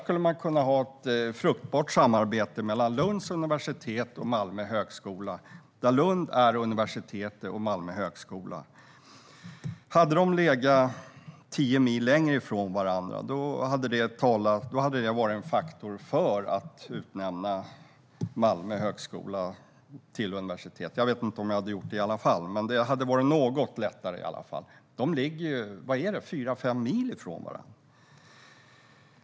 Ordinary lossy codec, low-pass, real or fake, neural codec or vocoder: none; none; real; none